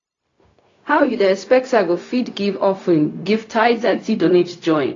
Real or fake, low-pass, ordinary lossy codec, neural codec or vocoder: fake; 7.2 kHz; AAC, 32 kbps; codec, 16 kHz, 0.4 kbps, LongCat-Audio-Codec